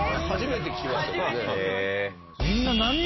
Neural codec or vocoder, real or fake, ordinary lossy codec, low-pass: none; real; MP3, 24 kbps; 7.2 kHz